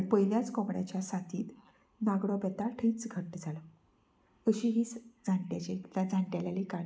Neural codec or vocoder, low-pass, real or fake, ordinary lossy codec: none; none; real; none